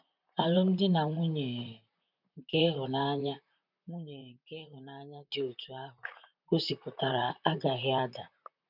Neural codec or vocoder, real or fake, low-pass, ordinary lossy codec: vocoder, 44.1 kHz, 128 mel bands, Pupu-Vocoder; fake; 5.4 kHz; none